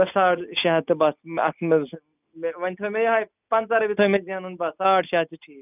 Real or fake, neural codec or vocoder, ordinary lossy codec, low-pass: real; none; none; 3.6 kHz